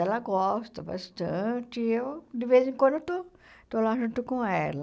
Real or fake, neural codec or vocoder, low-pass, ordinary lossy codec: real; none; none; none